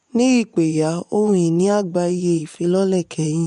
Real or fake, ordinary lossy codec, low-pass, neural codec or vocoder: fake; MP3, 64 kbps; 10.8 kHz; vocoder, 24 kHz, 100 mel bands, Vocos